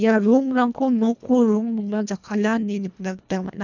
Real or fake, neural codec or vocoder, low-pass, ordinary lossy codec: fake; codec, 24 kHz, 1.5 kbps, HILCodec; 7.2 kHz; none